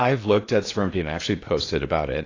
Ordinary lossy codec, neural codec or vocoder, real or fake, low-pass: AAC, 32 kbps; codec, 16 kHz in and 24 kHz out, 0.8 kbps, FocalCodec, streaming, 65536 codes; fake; 7.2 kHz